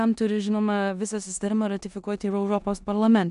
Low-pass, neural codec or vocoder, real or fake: 10.8 kHz; codec, 16 kHz in and 24 kHz out, 0.9 kbps, LongCat-Audio-Codec, four codebook decoder; fake